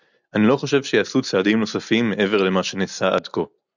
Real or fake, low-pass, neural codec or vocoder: real; 7.2 kHz; none